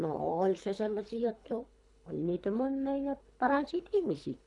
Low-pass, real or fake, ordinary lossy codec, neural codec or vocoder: 10.8 kHz; fake; none; codec, 24 kHz, 3 kbps, HILCodec